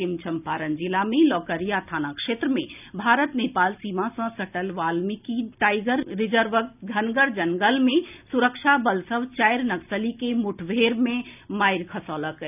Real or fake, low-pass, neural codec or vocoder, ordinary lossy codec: real; 3.6 kHz; none; none